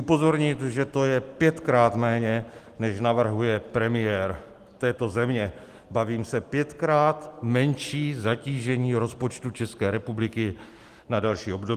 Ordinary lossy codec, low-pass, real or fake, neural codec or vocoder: Opus, 24 kbps; 14.4 kHz; real; none